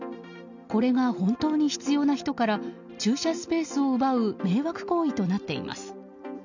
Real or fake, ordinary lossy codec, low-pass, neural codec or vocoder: real; none; 7.2 kHz; none